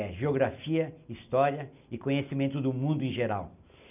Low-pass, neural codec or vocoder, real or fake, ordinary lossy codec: 3.6 kHz; none; real; none